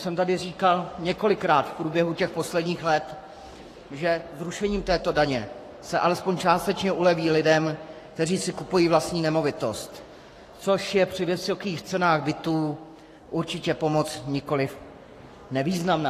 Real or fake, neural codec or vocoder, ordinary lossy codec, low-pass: fake; codec, 44.1 kHz, 7.8 kbps, Pupu-Codec; AAC, 48 kbps; 14.4 kHz